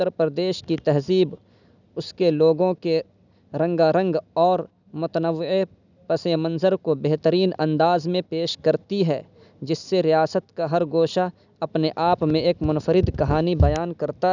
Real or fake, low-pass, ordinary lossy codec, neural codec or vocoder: real; 7.2 kHz; none; none